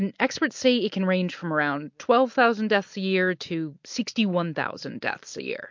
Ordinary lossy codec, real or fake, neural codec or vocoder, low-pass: MP3, 48 kbps; real; none; 7.2 kHz